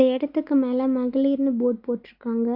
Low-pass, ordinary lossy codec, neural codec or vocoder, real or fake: 5.4 kHz; Opus, 64 kbps; none; real